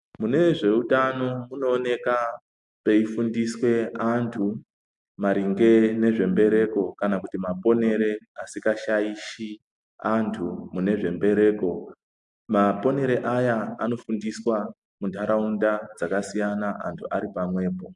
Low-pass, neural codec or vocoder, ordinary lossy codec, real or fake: 10.8 kHz; none; MP3, 64 kbps; real